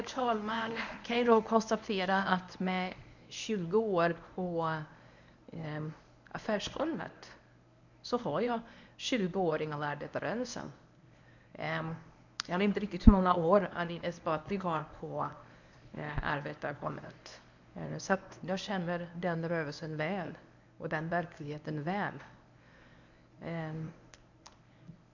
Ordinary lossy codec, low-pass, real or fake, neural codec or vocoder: none; 7.2 kHz; fake; codec, 24 kHz, 0.9 kbps, WavTokenizer, medium speech release version 1